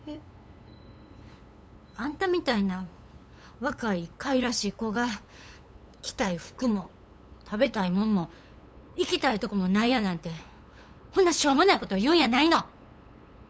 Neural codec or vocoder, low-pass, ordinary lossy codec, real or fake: codec, 16 kHz, 8 kbps, FunCodec, trained on LibriTTS, 25 frames a second; none; none; fake